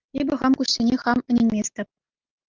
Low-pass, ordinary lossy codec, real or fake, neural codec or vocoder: 7.2 kHz; Opus, 24 kbps; real; none